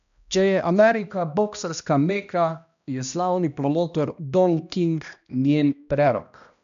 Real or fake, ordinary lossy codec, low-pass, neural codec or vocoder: fake; none; 7.2 kHz; codec, 16 kHz, 1 kbps, X-Codec, HuBERT features, trained on balanced general audio